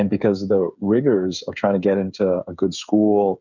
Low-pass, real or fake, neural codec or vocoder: 7.2 kHz; fake; codec, 16 kHz, 8 kbps, FreqCodec, smaller model